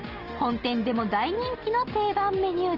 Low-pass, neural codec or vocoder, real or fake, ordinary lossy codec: 5.4 kHz; none; real; Opus, 16 kbps